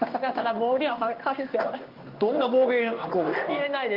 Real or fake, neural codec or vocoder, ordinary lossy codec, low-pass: fake; codec, 16 kHz in and 24 kHz out, 1 kbps, XY-Tokenizer; Opus, 24 kbps; 5.4 kHz